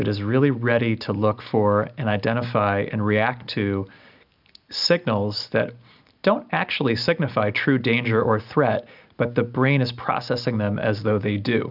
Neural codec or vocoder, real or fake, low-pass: none; real; 5.4 kHz